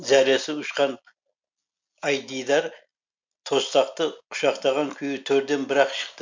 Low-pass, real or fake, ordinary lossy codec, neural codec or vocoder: 7.2 kHz; real; MP3, 64 kbps; none